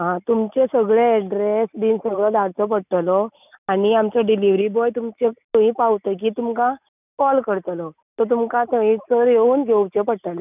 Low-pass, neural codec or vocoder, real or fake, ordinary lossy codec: 3.6 kHz; none; real; none